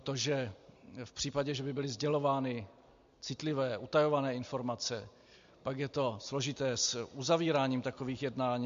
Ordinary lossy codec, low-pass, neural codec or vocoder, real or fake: MP3, 48 kbps; 7.2 kHz; none; real